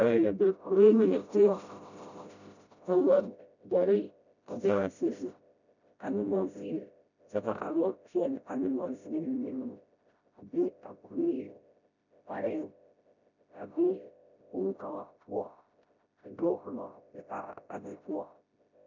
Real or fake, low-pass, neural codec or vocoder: fake; 7.2 kHz; codec, 16 kHz, 0.5 kbps, FreqCodec, smaller model